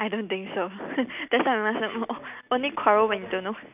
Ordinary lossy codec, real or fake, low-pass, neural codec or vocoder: none; real; 3.6 kHz; none